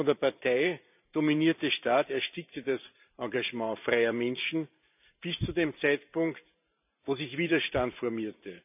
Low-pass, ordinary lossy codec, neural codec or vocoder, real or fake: 3.6 kHz; none; vocoder, 44.1 kHz, 128 mel bands every 512 samples, BigVGAN v2; fake